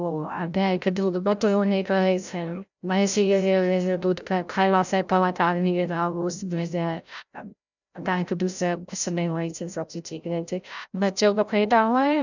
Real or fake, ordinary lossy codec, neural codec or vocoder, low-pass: fake; none; codec, 16 kHz, 0.5 kbps, FreqCodec, larger model; 7.2 kHz